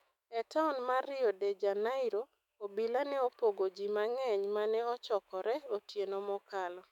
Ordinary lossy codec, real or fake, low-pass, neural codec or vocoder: none; fake; 19.8 kHz; autoencoder, 48 kHz, 128 numbers a frame, DAC-VAE, trained on Japanese speech